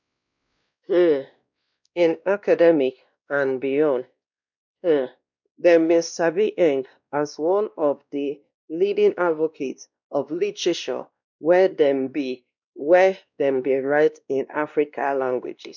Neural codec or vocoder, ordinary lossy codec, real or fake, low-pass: codec, 16 kHz, 1 kbps, X-Codec, WavLM features, trained on Multilingual LibriSpeech; none; fake; 7.2 kHz